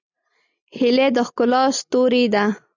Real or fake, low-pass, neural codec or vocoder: real; 7.2 kHz; none